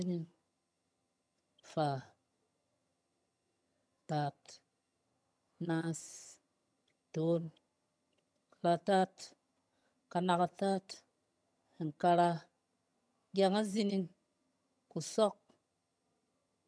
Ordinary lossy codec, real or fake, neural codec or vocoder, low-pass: none; fake; vocoder, 22.05 kHz, 80 mel bands, HiFi-GAN; none